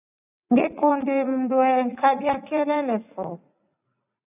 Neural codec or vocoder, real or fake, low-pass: vocoder, 44.1 kHz, 80 mel bands, Vocos; fake; 3.6 kHz